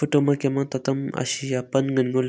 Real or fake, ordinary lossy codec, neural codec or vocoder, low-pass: real; none; none; none